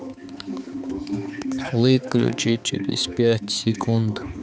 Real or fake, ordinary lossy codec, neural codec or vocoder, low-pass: fake; none; codec, 16 kHz, 4 kbps, X-Codec, HuBERT features, trained on balanced general audio; none